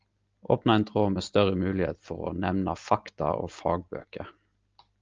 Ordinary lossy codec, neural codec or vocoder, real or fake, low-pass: Opus, 24 kbps; none; real; 7.2 kHz